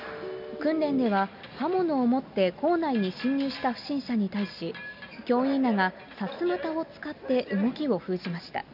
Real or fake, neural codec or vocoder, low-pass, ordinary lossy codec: real; none; 5.4 kHz; AAC, 48 kbps